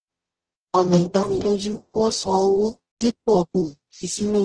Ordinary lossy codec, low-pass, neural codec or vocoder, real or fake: Opus, 16 kbps; 9.9 kHz; codec, 44.1 kHz, 0.9 kbps, DAC; fake